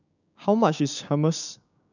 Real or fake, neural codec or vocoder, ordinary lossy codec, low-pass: fake; codec, 16 kHz, 6 kbps, DAC; none; 7.2 kHz